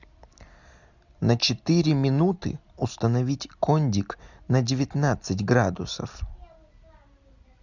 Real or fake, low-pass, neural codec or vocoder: real; 7.2 kHz; none